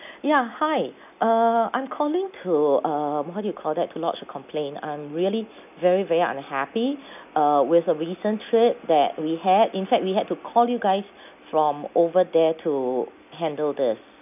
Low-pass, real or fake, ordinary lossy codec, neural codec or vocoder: 3.6 kHz; real; none; none